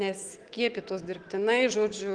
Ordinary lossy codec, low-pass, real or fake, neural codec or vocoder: Opus, 16 kbps; 9.9 kHz; fake; autoencoder, 48 kHz, 128 numbers a frame, DAC-VAE, trained on Japanese speech